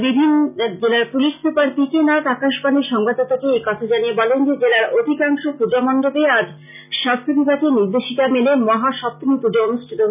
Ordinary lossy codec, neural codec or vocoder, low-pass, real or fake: none; none; 3.6 kHz; real